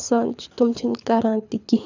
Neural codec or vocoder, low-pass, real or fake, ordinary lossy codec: codec, 24 kHz, 6 kbps, HILCodec; 7.2 kHz; fake; none